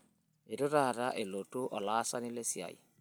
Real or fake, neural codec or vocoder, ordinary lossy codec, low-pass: real; none; none; none